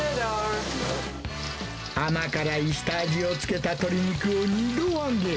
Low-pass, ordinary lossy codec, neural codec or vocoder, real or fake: none; none; none; real